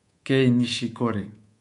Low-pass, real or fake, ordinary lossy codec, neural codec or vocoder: 10.8 kHz; fake; MP3, 64 kbps; codec, 24 kHz, 3.1 kbps, DualCodec